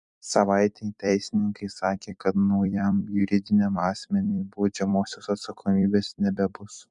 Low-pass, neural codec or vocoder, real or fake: 10.8 kHz; vocoder, 44.1 kHz, 128 mel bands every 256 samples, BigVGAN v2; fake